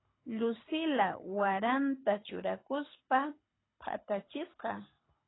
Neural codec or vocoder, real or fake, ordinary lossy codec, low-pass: codec, 24 kHz, 6 kbps, HILCodec; fake; AAC, 16 kbps; 7.2 kHz